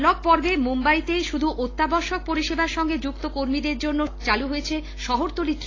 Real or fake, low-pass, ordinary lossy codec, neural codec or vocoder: real; 7.2 kHz; AAC, 32 kbps; none